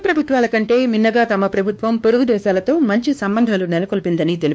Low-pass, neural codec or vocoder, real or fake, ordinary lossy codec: none; codec, 16 kHz, 2 kbps, X-Codec, WavLM features, trained on Multilingual LibriSpeech; fake; none